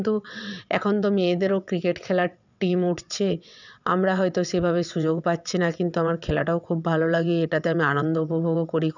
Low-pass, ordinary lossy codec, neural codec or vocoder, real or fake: 7.2 kHz; none; vocoder, 22.05 kHz, 80 mel bands, WaveNeXt; fake